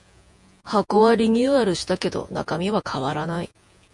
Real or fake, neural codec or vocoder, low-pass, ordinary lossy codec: fake; vocoder, 48 kHz, 128 mel bands, Vocos; 10.8 kHz; MP3, 64 kbps